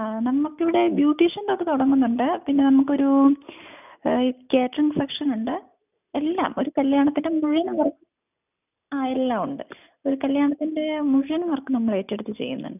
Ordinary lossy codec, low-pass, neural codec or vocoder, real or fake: none; 3.6 kHz; none; real